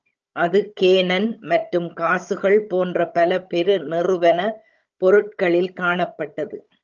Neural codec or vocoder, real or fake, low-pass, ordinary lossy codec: codec, 16 kHz, 16 kbps, FunCodec, trained on Chinese and English, 50 frames a second; fake; 7.2 kHz; Opus, 24 kbps